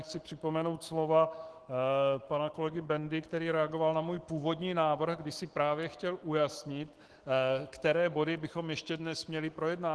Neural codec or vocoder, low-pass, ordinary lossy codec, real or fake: autoencoder, 48 kHz, 128 numbers a frame, DAC-VAE, trained on Japanese speech; 10.8 kHz; Opus, 16 kbps; fake